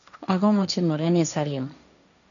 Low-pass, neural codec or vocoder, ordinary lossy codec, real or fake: 7.2 kHz; codec, 16 kHz, 1.1 kbps, Voila-Tokenizer; none; fake